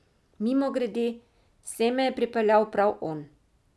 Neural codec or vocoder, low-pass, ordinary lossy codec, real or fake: none; none; none; real